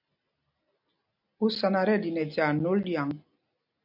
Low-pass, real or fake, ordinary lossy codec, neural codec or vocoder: 5.4 kHz; real; AAC, 48 kbps; none